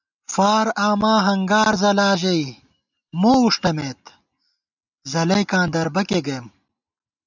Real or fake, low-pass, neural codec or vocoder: real; 7.2 kHz; none